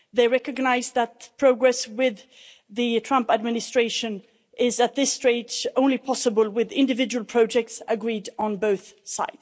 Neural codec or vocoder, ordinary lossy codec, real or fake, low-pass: none; none; real; none